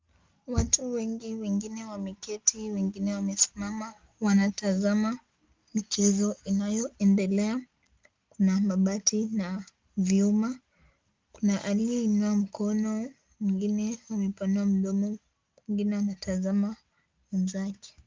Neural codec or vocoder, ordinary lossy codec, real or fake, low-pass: none; Opus, 24 kbps; real; 7.2 kHz